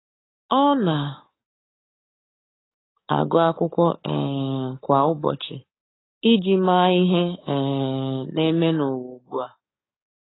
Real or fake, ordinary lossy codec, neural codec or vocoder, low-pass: fake; AAC, 16 kbps; codec, 44.1 kHz, 7.8 kbps, DAC; 7.2 kHz